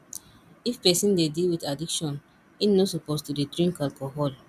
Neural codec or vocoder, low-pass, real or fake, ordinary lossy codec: none; 14.4 kHz; real; none